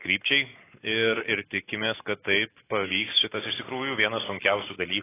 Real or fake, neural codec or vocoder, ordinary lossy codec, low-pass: real; none; AAC, 16 kbps; 3.6 kHz